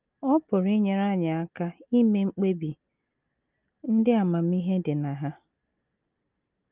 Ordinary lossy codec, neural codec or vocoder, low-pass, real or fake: Opus, 24 kbps; none; 3.6 kHz; real